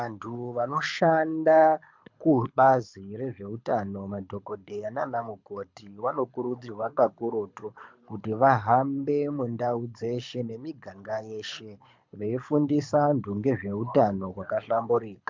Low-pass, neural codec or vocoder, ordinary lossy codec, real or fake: 7.2 kHz; codec, 24 kHz, 6 kbps, HILCodec; MP3, 64 kbps; fake